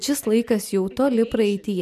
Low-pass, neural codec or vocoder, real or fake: 14.4 kHz; none; real